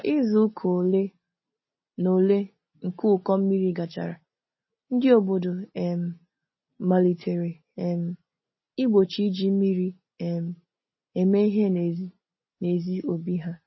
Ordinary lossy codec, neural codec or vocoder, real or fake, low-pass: MP3, 24 kbps; none; real; 7.2 kHz